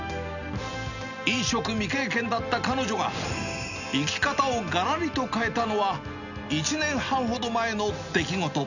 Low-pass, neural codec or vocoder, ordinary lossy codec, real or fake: 7.2 kHz; none; none; real